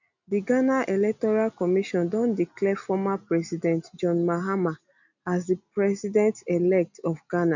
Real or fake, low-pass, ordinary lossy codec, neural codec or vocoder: real; 7.2 kHz; none; none